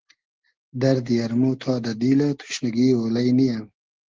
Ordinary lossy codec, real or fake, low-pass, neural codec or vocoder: Opus, 16 kbps; real; 7.2 kHz; none